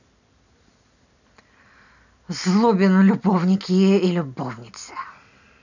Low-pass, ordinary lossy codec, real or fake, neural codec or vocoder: 7.2 kHz; none; real; none